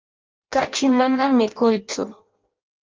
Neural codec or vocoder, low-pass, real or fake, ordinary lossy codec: codec, 16 kHz in and 24 kHz out, 0.6 kbps, FireRedTTS-2 codec; 7.2 kHz; fake; Opus, 32 kbps